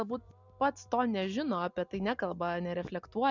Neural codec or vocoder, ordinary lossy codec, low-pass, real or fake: none; Opus, 64 kbps; 7.2 kHz; real